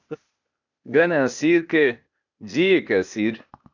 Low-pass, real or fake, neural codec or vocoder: 7.2 kHz; fake; codec, 16 kHz, 0.8 kbps, ZipCodec